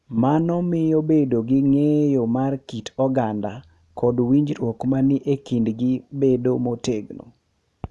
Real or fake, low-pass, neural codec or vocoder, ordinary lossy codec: real; none; none; none